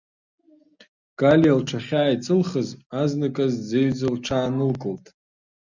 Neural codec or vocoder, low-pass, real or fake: none; 7.2 kHz; real